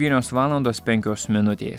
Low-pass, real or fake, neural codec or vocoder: 19.8 kHz; real; none